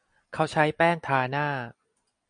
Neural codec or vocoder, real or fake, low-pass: none; real; 9.9 kHz